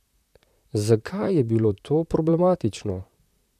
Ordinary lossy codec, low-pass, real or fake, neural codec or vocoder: none; 14.4 kHz; fake; vocoder, 44.1 kHz, 128 mel bands, Pupu-Vocoder